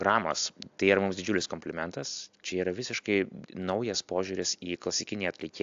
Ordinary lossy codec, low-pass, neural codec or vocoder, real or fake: AAC, 64 kbps; 7.2 kHz; none; real